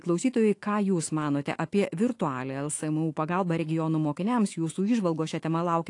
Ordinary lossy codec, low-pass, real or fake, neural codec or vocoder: AAC, 48 kbps; 10.8 kHz; fake; autoencoder, 48 kHz, 128 numbers a frame, DAC-VAE, trained on Japanese speech